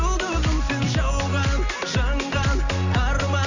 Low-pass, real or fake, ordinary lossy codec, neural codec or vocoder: 7.2 kHz; real; none; none